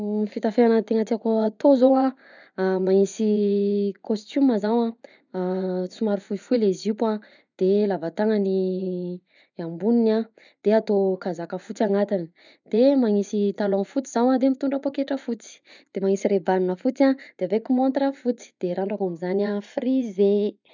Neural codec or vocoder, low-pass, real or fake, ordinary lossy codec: vocoder, 24 kHz, 100 mel bands, Vocos; 7.2 kHz; fake; none